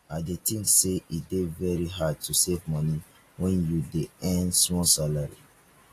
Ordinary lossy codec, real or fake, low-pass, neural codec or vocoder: AAC, 48 kbps; real; 14.4 kHz; none